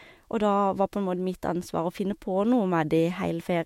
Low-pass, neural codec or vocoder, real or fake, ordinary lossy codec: 19.8 kHz; autoencoder, 48 kHz, 128 numbers a frame, DAC-VAE, trained on Japanese speech; fake; MP3, 64 kbps